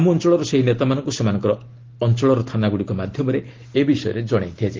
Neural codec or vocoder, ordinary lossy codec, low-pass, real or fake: none; Opus, 16 kbps; 7.2 kHz; real